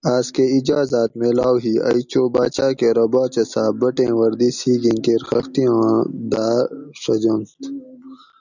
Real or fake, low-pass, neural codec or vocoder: real; 7.2 kHz; none